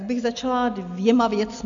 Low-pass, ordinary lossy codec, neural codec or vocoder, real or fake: 7.2 kHz; MP3, 64 kbps; none; real